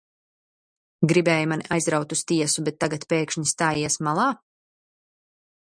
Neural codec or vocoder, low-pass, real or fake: none; 9.9 kHz; real